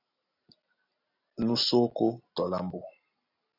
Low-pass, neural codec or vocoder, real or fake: 5.4 kHz; none; real